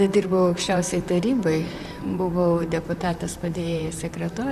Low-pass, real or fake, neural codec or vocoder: 14.4 kHz; fake; vocoder, 44.1 kHz, 128 mel bands, Pupu-Vocoder